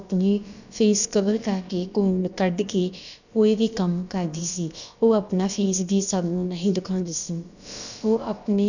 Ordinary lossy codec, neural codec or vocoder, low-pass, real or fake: none; codec, 16 kHz, about 1 kbps, DyCAST, with the encoder's durations; 7.2 kHz; fake